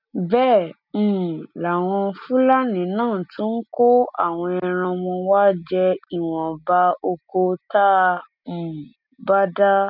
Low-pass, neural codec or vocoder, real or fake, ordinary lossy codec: 5.4 kHz; none; real; none